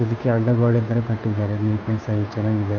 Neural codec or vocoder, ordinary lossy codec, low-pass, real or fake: codec, 16 kHz, 2 kbps, FunCodec, trained on Chinese and English, 25 frames a second; none; none; fake